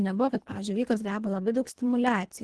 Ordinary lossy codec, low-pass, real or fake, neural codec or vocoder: Opus, 16 kbps; 10.8 kHz; fake; codec, 24 kHz, 1.5 kbps, HILCodec